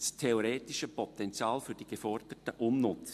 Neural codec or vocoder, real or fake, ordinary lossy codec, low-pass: none; real; MP3, 64 kbps; 14.4 kHz